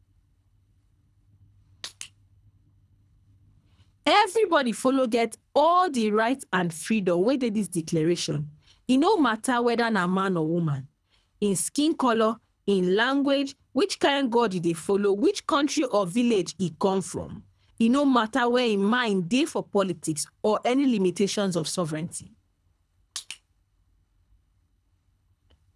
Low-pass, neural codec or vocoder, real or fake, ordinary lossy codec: none; codec, 24 kHz, 3 kbps, HILCodec; fake; none